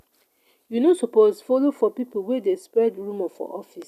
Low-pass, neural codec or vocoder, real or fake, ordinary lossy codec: 14.4 kHz; none; real; none